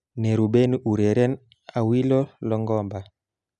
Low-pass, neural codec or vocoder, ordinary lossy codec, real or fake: 10.8 kHz; none; none; real